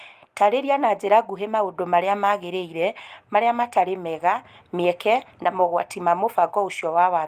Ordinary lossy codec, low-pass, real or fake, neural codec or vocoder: Opus, 32 kbps; 14.4 kHz; real; none